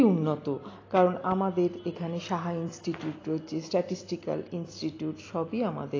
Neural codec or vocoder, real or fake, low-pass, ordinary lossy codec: none; real; 7.2 kHz; AAC, 32 kbps